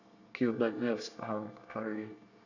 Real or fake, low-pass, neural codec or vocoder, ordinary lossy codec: fake; 7.2 kHz; codec, 24 kHz, 1 kbps, SNAC; none